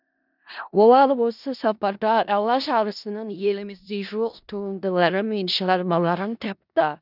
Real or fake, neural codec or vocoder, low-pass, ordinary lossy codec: fake; codec, 16 kHz in and 24 kHz out, 0.4 kbps, LongCat-Audio-Codec, four codebook decoder; 5.4 kHz; none